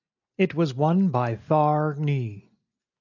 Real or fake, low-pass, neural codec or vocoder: real; 7.2 kHz; none